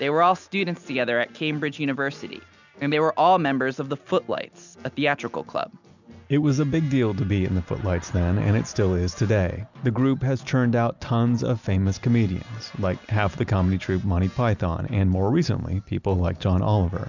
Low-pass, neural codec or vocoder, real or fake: 7.2 kHz; none; real